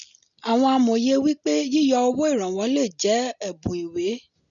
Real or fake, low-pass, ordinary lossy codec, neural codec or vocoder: real; 7.2 kHz; none; none